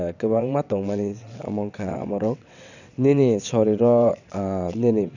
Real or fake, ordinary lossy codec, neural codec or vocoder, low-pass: fake; none; vocoder, 22.05 kHz, 80 mel bands, WaveNeXt; 7.2 kHz